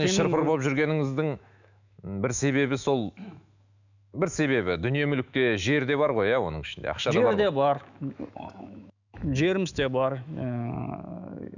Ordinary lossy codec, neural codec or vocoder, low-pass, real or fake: none; autoencoder, 48 kHz, 128 numbers a frame, DAC-VAE, trained on Japanese speech; 7.2 kHz; fake